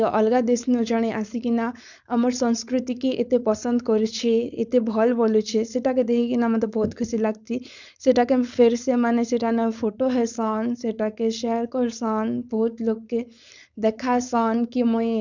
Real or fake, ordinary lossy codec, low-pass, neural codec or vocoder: fake; none; 7.2 kHz; codec, 16 kHz, 4.8 kbps, FACodec